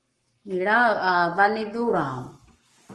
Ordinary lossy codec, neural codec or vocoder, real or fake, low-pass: Opus, 24 kbps; codec, 44.1 kHz, 7.8 kbps, Pupu-Codec; fake; 10.8 kHz